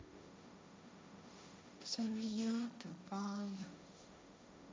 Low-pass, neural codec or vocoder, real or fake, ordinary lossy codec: none; codec, 16 kHz, 1.1 kbps, Voila-Tokenizer; fake; none